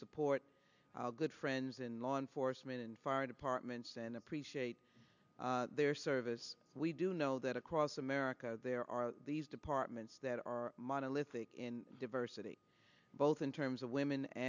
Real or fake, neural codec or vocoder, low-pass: real; none; 7.2 kHz